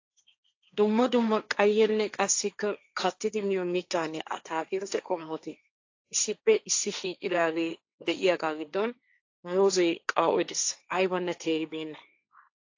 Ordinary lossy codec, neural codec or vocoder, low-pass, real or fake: AAC, 48 kbps; codec, 16 kHz, 1.1 kbps, Voila-Tokenizer; 7.2 kHz; fake